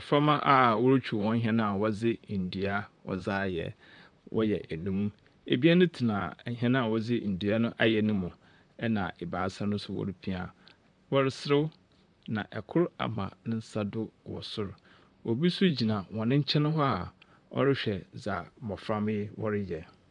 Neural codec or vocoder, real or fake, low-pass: vocoder, 44.1 kHz, 128 mel bands, Pupu-Vocoder; fake; 10.8 kHz